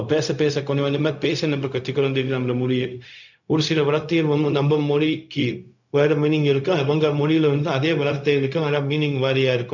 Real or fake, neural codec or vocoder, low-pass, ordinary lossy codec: fake; codec, 16 kHz, 0.4 kbps, LongCat-Audio-Codec; 7.2 kHz; none